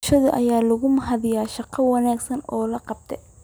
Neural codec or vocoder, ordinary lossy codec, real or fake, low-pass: none; none; real; none